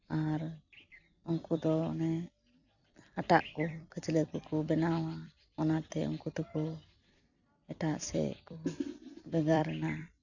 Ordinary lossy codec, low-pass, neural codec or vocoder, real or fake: none; 7.2 kHz; vocoder, 44.1 kHz, 128 mel bands every 256 samples, BigVGAN v2; fake